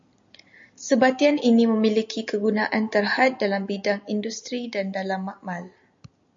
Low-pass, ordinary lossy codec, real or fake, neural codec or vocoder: 7.2 kHz; MP3, 48 kbps; real; none